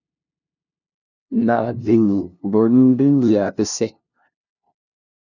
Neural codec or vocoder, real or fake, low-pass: codec, 16 kHz, 0.5 kbps, FunCodec, trained on LibriTTS, 25 frames a second; fake; 7.2 kHz